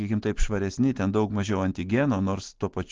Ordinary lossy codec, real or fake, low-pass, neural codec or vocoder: Opus, 16 kbps; real; 7.2 kHz; none